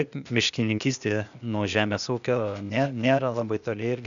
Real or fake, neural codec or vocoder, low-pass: fake; codec, 16 kHz, 0.8 kbps, ZipCodec; 7.2 kHz